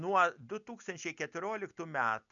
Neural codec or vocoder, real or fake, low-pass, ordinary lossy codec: none; real; 7.2 kHz; MP3, 96 kbps